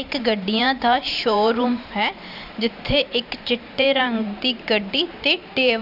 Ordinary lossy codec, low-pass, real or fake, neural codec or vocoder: none; 5.4 kHz; fake; vocoder, 44.1 kHz, 128 mel bands every 512 samples, BigVGAN v2